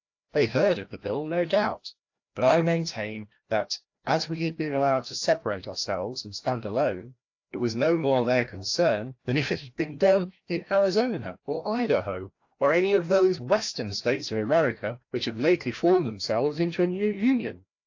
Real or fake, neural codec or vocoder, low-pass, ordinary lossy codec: fake; codec, 16 kHz, 1 kbps, FreqCodec, larger model; 7.2 kHz; AAC, 48 kbps